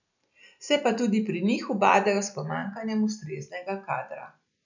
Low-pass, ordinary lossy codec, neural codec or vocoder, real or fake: 7.2 kHz; none; none; real